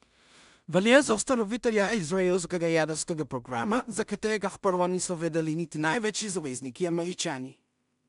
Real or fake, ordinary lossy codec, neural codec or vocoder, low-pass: fake; MP3, 96 kbps; codec, 16 kHz in and 24 kHz out, 0.4 kbps, LongCat-Audio-Codec, two codebook decoder; 10.8 kHz